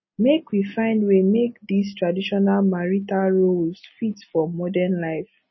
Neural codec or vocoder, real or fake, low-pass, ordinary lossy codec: none; real; 7.2 kHz; MP3, 24 kbps